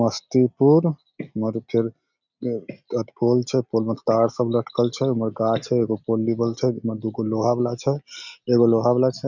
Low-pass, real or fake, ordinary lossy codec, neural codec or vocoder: 7.2 kHz; real; none; none